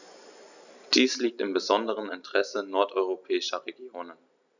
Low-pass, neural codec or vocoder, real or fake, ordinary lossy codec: 7.2 kHz; none; real; none